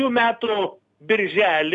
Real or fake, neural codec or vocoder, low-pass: real; none; 10.8 kHz